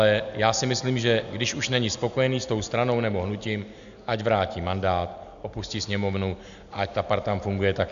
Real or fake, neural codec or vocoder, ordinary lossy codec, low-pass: real; none; AAC, 96 kbps; 7.2 kHz